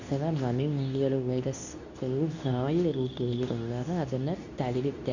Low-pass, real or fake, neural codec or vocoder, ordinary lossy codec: 7.2 kHz; fake; codec, 24 kHz, 0.9 kbps, WavTokenizer, medium speech release version 2; none